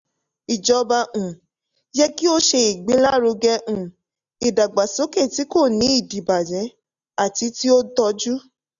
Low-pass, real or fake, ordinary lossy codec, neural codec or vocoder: 7.2 kHz; real; none; none